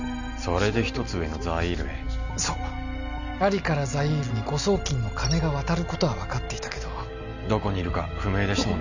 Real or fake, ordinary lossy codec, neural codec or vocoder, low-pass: real; none; none; 7.2 kHz